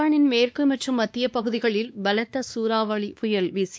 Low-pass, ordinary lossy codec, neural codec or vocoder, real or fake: none; none; codec, 16 kHz, 2 kbps, X-Codec, WavLM features, trained on Multilingual LibriSpeech; fake